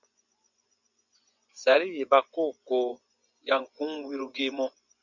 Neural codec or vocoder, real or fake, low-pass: vocoder, 24 kHz, 100 mel bands, Vocos; fake; 7.2 kHz